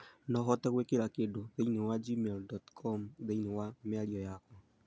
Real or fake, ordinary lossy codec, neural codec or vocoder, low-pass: real; none; none; none